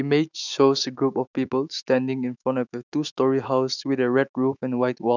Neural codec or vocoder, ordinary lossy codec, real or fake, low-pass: autoencoder, 48 kHz, 128 numbers a frame, DAC-VAE, trained on Japanese speech; none; fake; 7.2 kHz